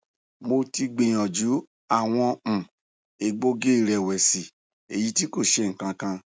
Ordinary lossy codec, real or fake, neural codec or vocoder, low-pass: none; real; none; none